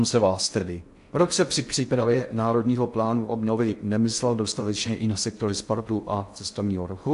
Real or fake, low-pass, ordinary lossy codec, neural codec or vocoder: fake; 10.8 kHz; AAC, 64 kbps; codec, 16 kHz in and 24 kHz out, 0.6 kbps, FocalCodec, streaming, 4096 codes